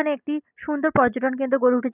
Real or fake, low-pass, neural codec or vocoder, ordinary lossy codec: real; 3.6 kHz; none; none